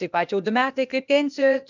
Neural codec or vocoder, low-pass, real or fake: codec, 16 kHz, 0.8 kbps, ZipCodec; 7.2 kHz; fake